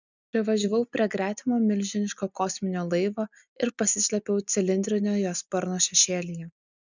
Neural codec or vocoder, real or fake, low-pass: none; real; 7.2 kHz